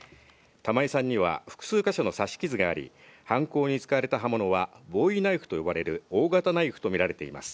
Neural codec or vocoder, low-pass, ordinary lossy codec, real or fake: none; none; none; real